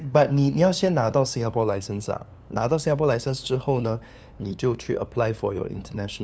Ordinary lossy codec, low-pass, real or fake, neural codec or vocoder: none; none; fake; codec, 16 kHz, 2 kbps, FunCodec, trained on LibriTTS, 25 frames a second